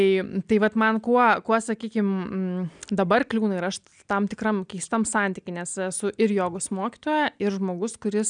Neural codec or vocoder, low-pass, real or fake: none; 9.9 kHz; real